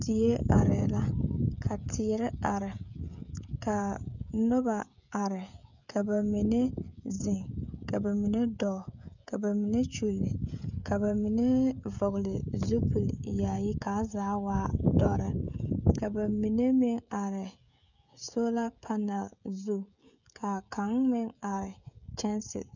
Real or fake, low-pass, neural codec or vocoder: fake; 7.2 kHz; codec, 16 kHz, 16 kbps, FreqCodec, smaller model